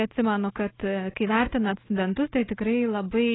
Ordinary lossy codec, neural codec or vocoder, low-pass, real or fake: AAC, 16 kbps; none; 7.2 kHz; real